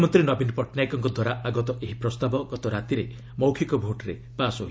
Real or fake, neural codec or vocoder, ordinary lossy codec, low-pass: real; none; none; none